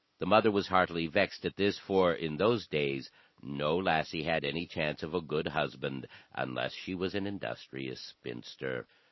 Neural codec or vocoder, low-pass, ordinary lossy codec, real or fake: none; 7.2 kHz; MP3, 24 kbps; real